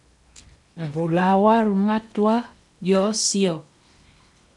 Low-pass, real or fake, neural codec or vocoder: 10.8 kHz; fake; codec, 16 kHz in and 24 kHz out, 0.8 kbps, FocalCodec, streaming, 65536 codes